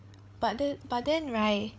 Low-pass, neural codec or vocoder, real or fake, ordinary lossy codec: none; codec, 16 kHz, 16 kbps, FreqCodec, larger model; fake; none